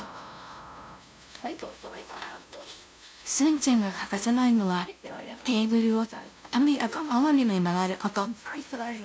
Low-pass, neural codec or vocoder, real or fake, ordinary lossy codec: none; codec, 16 kHz, 0.5 kbps, FunCodec, trained on LibriTTS, 25 frames a second; fake; none